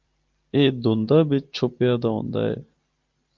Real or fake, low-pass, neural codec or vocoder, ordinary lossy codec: real; 7.2 kHz; none; Opus, 24 kbps